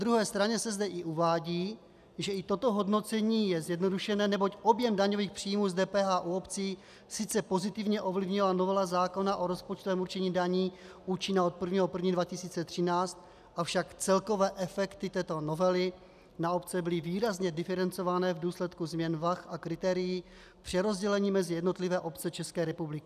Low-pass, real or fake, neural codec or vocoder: 14.4 kHz; real; none